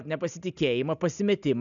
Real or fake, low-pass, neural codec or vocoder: real; 7.2 kHz; none